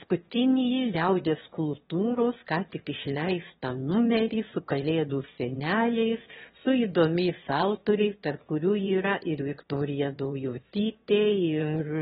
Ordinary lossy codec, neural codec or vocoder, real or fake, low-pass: AAC, 16 kbps; autoencoder, 22.05 kHz, a latent of 192 numbers a frame, VITS, trained on one speaker; fake; 9.9 kHz